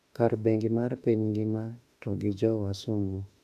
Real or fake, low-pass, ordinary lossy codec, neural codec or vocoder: fake; 14.4 kHz; none; autoencoder, 48 kHz, 32 numbers a frame, DAC-VAE, trained on Japanese speech